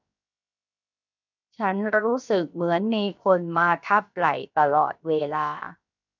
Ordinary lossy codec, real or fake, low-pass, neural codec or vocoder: none; fake; 7.2 kHz; codec, 16 kHz, 0.7 kbps, FocalCodec